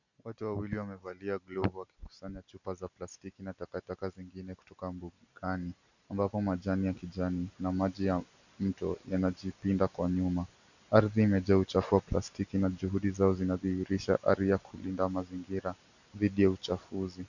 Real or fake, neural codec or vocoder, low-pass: real; none; 7.2 kHz